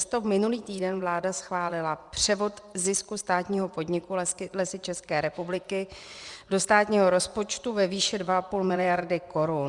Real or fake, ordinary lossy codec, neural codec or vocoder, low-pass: fake; Opus, 64 kbps; vocoder, 24 kHz, 100 mel bands, Vocos; 10.8 kHz